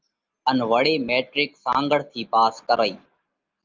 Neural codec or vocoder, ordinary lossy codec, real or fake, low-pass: none; Opus, 24 kbps; real; 7.2 kHz